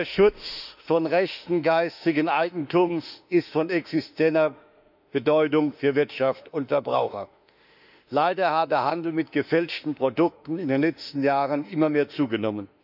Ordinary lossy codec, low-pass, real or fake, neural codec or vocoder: none; 5.4 kHz; fake; autoencoder, 48 kHz, 32 numbers a frame, DAC-VAE, trained on Japanese speech